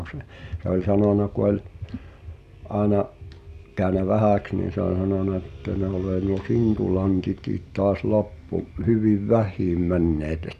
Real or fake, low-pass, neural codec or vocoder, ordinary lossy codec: real; 14.4 kHz; none; none